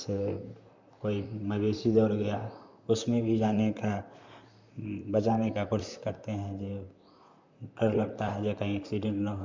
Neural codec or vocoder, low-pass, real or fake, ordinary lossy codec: vocoder, 44.1 kHz, 128 mel bands, Pupu-Vocoder; 7.2 kHz; fake; MP3, 64 kbps